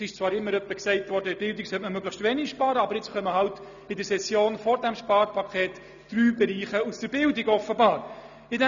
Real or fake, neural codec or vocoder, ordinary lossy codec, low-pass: real; none; none; 7.2 kHz